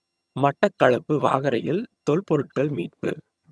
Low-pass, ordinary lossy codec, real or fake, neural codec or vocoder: none; none; fake; vocoder, 22.05 kHz, 80 mel bands, HiFi-GAN